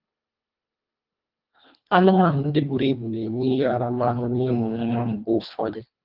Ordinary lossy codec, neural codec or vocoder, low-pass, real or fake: Opus, 24 kbps; codec, 24 kHz, 1.5 kbps, HILCodec; 5.4 kHz; fake